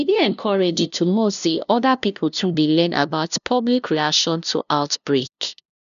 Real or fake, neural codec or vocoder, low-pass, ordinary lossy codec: fake; codec, 16 kHz, 0.5 kbps, FunCodec, trained on LibriTTS, 25 frames a second; 7.2 kHz; none